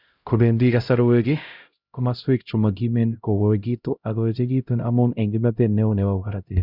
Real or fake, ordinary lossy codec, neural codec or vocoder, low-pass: fake; Opus, 64 kbps; codec, 16 kHz, 0.5 kbps, X-Codec, HuBERT features, trained on LibriSpeech; 5.4 kHz